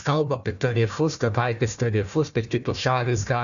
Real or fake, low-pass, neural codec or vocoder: fake; 7.2 kHz; codec, 16 kHz, 1 kbps, FunCodec, trained on Chinese and English, 50 frames a second